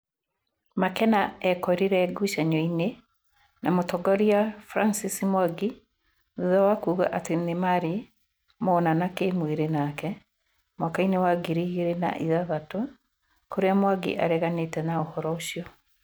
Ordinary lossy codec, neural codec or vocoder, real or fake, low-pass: none; none; real; none